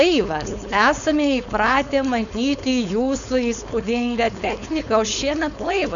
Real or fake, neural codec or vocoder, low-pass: fake; codec, 16 kHz, 4.8 kbps, FACodec; 7.2 kHz